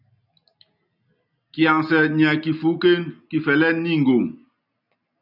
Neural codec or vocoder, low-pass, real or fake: none; 5.4 kHz; real